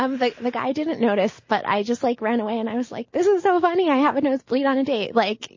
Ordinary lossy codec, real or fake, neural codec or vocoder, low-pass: MP3, 32 kbps; real; none; 7.2 kHz